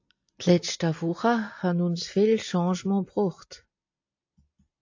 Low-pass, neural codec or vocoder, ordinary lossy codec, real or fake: 7.2 kHz; none; AAC, 48 kbps; real